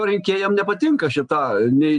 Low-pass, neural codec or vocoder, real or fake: 9.9 kHz; none; real